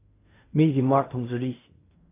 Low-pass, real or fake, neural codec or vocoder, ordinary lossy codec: 3.6 kHz; fake; codec, 16 kHz in and 24 kHz out, 0.4 kbps, LongCat-Audio-Codec, fine tuned four codebook decoder; AAC, 24 kbps